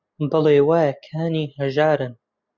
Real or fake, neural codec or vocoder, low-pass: real; none; 7.2 kHz